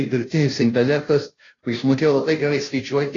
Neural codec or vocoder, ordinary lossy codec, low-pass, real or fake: codec, 16 kHz, 0.5 kbps, FunCodec, trained on Chinese and English, 25 frames a second; AAC, 32 kbps; 7.2 kHz; fake